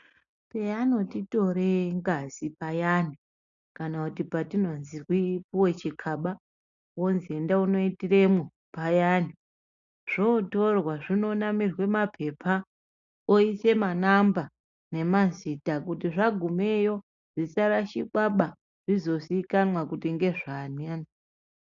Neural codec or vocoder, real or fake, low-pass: none; real; 7.2 kHz